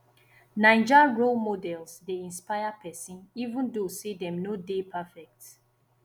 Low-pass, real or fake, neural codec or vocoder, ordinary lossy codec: 19.8 kHz; real; none; none